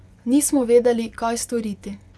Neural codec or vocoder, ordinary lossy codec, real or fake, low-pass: vocoder, 24 kHz, 100 mel bands, Vocos; none; fake; none